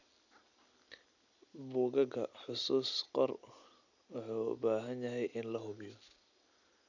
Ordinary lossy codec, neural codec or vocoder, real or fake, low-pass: none; none; real; 7.2 kHz